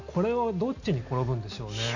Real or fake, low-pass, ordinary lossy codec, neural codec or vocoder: real; 7.2 kHz; none; none